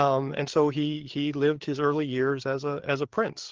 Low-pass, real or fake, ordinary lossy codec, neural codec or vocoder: 7.2 kHz; fake; Opus, 16 kbps; codec, 16 kHz, 4 kbps, FreqCodec, larger model